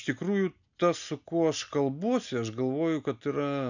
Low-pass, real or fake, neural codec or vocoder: 7.2 kHz; real; none